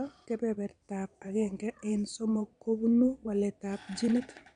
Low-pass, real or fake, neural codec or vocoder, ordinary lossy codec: 9.9 kHz; fake; vocoder, 22.05 kHz, 80 mel bands, Vocos; none